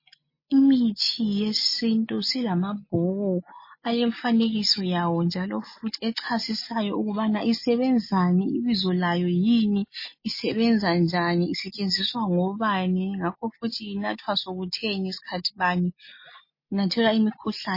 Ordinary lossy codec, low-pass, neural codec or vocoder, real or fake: MP3, 24 kbps; 5.4 kHz; none; real